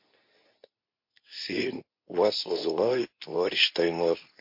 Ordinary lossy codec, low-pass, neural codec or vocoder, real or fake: MP3, 32 kbps; 5.4 kHz; codec, 24 kHz, 0.9 kbps, WavTokenizer, medium speech release version 2; fake